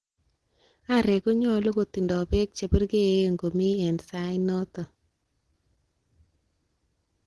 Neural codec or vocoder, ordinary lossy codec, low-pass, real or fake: none; Opus, 16 kbps; 10.8 kHz; real